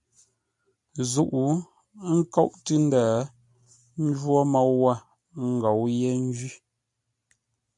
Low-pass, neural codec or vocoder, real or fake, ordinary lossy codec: 10.8 kHz; none; real; MP3, 96 kbps